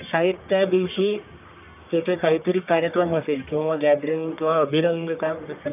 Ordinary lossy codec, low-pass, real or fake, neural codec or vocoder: none; 3.6 kHz; fake; codec, 44.1 kHz, 1.7 kbps, Pupu-Codec